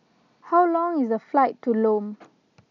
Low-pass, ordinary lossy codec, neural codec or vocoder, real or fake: 7.2 kHz; none; none; real